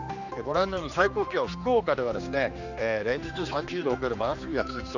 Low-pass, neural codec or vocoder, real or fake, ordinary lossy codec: 7.2 kHz; codec, 16 kHz, 2 kbps, X-Codec, HuBERT features, trained on general audio; fake; none